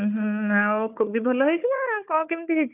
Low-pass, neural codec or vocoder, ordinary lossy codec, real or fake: 3.6 kHz; codec, 16 kHz, 4 kbps, FunCodec, trained on Chinese and English, 50 frames a second; none; fake